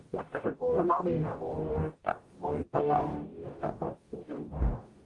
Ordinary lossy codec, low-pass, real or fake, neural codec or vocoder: Opus, 24 kbps; 10.8 kHz; fake; codec, 44.1 kHz, 0.9 kbps, DAC